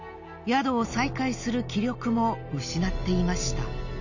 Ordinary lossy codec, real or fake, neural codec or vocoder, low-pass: none; real; none; 7.2 kHz